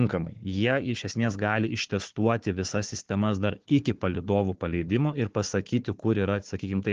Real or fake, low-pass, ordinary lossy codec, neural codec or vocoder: fake; 7.2 kHz; Opus, 16 kbps; codec, 16 kHz, 4 kbps, FunCodec, trained on Chinese and English, 50 frames a second